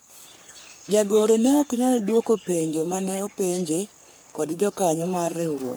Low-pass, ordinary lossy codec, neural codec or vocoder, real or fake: none; none; codec, 44.1 kHz, 3.4 kbps, Pupu-Codec; fake